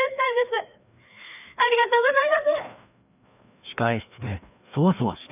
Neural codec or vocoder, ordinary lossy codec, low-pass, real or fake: codec, 16 kHz, 2 kbps, FreqCodec, larger model; none; 3.6 kHz; fake